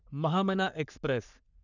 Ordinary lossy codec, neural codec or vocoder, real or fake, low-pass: none; codec, 44.1 kHz, 3.4 kbps, Pupu-Codec; fake; 7.2 kHz